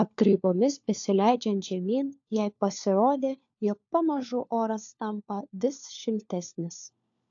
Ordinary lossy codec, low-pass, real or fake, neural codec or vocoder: AAC, 64 kbps; 7.2 kHz; fake; codec, 16 kHz, 4 kbps, FunCodec, trained on LibriTTS, 50 frames a second